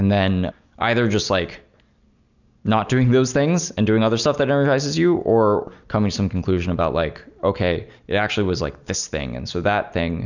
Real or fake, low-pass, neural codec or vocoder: real; 7.2 kHz; none